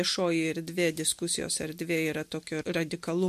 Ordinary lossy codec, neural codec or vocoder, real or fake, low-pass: MP3, 64 kbps; none; real; 14.4 kHz